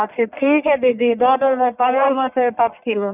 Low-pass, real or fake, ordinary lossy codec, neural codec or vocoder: 3.6 kHz; fake; none; codec, 44.1 kHz, 1.7 kbps, Pupu-Codec